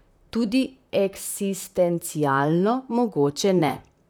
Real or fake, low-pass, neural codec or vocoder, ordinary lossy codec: fake; none; vocoder, 44.1 kHz, 128 mel bands, Pupu-Vocoder; none